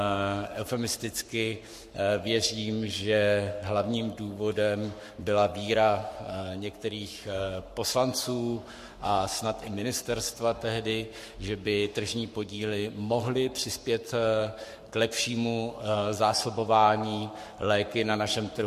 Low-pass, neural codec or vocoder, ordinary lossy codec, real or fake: 14.4 kHz; codec, 44.1 kHz, 7.8 kbps, Pupu-Codec; MP3, 64 kbps; fake